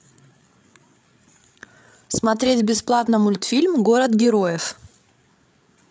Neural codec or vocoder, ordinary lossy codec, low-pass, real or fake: codec, 16 kHz, 8 kbps, FreqCodec, larger model; none; none; fake